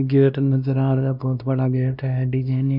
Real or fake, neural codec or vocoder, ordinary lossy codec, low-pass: fake; codec, 16 kHz, 1 kbps, X-Codec, WavLM features, trained on Multilingual LibriSpeech; none; 5.4 kHz